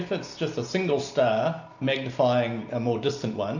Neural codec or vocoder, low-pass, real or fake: none; 7.2 kHz; real